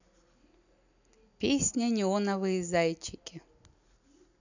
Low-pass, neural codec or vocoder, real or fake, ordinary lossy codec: 7.2 kHz; none; real; none